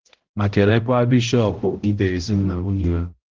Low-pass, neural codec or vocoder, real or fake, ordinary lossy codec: 7.2 kHz; codec, 16 kHz, 0.5 kbps, X-Codec, HuBERT features, trained on balanced general audio; fake; Opus, 16 kbps